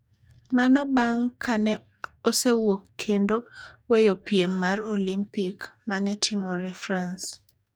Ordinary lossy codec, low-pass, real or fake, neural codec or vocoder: none; none; fake; codec, 44.1 kHz, 2.6 kbps, DAC